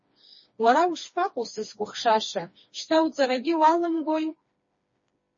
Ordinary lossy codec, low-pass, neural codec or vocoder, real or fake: MP3, 32 kbps; 7.2 kHz; codec, 16 kHz, 2 kbps, FreqCodec, smaller model; fake